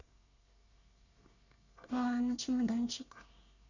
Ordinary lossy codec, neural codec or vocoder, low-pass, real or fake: none; codec, 24 kHz, 1 kbps, SNAC; 7.2 kHz; fake